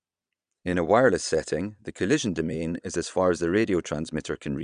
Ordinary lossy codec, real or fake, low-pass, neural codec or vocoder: none; fake; 9.9 kHz; vocoder, 22.05 kHz, 80 mel bands, Vocos